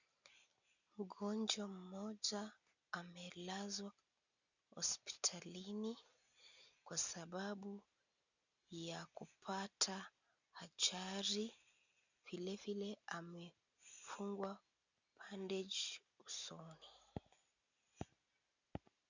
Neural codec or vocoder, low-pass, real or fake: none; 7.2 kHz; real